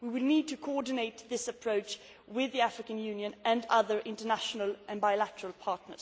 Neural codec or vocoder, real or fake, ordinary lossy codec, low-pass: none; real; none; none